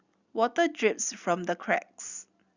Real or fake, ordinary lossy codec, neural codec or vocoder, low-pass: real; Opus, 64 kbps; none; 7.2 kHz